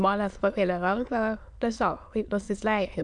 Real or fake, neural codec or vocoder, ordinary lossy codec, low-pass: fake; autoencoder, 22.05 kHz, a latent of 192 numbers a frame, VITS, trained on many speakers; AAC, 64 kbps; 9.9 kHz